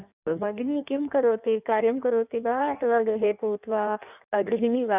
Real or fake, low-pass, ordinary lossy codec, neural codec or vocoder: fake; 3.6 kHz; none; codec, 16 kHz in and 24 kHz out, 1.1 kbps, FireRedTTS-2 codec